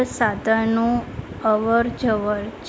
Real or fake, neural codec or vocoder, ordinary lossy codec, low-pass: real; none; none; none